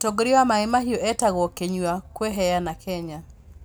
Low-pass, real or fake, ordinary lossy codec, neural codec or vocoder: none; real; none; none